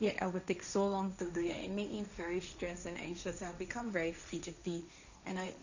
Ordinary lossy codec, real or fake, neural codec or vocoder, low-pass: none; fake; codec, 16 kHz, 1.1 kbps, Voila-Tokenizer; 7.2 kHz